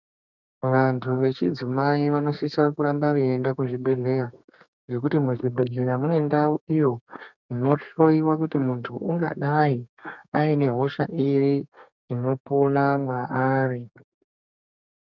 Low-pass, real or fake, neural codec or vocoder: 7.2 kHz; fake; codec, 32 kHz, 1.9 kbps, SNAC